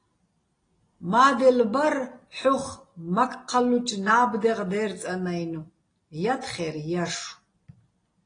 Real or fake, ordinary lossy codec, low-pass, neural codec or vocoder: real; AAC, 32 kbps; 9.9 kHz; none